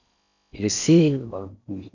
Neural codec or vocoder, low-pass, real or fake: codec, 16 kHz in and 24 kHz out, 0.6 kbps, FocalCodec, streaming, 4096 codes; 7.2 kHz; fake